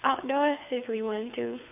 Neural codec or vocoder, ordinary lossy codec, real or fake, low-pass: codec, 24 kHz, 6 kbps, HILCodec; AAC, 32 kbps; fake; 3.6 kHz